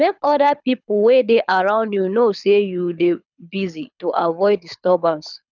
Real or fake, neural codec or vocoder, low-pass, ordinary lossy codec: fake; codec, 24 kHz, 6 kbps, HILCodec; 7.2 kHz; none